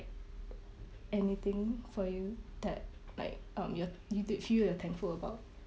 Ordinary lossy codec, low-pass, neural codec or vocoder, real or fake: none; none; none; real